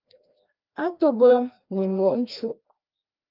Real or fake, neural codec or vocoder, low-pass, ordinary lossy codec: fake; codec, 16 kHz, 1 kbps, FreqCodec, larger model; 5.4 kHz; Opus, 32 kbps